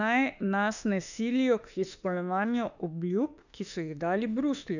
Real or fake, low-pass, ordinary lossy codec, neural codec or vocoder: fake; 7.2 kHz; none; autoencoder, 48 kHz, 32 numbers a frame, DAC-VAE, trained on Japanese speech